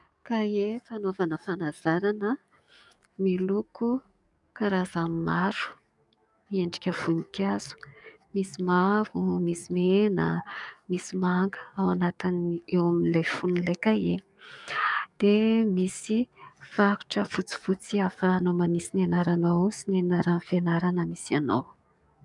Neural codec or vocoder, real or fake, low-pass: codec, 44.1 kHz, 7.8 kbps, DAC; fake; 10.8 kHz